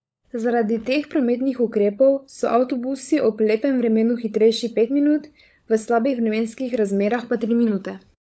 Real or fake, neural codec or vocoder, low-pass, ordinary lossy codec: fake; codec, 16 kHz, 16 kbps, FunCodec, trained on LibriTTS, 50 frames a second; none; none